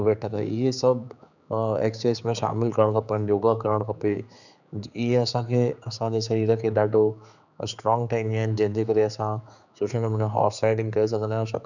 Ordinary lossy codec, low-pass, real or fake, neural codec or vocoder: none; 7.2 kHz; fake; codec, 16 kHz, 4 kbps, X-Codec, HuBERT features, trained on general audio